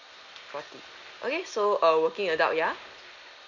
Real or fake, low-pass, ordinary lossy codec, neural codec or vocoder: real; 7.2 kHz; none; none